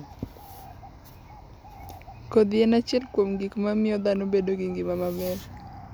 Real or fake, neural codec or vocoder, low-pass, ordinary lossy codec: real; none; none; none